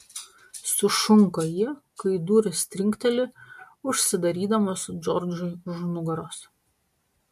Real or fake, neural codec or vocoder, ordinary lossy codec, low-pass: real; none; MP3, 64 kbps; 14.4 kHz